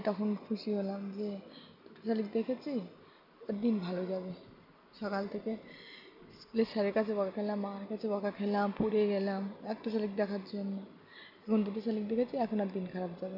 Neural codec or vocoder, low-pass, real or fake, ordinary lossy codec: none; 5.4 kHz; real; none